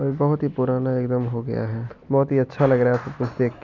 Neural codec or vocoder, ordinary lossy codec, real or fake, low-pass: none; none; real; 7.2 kHz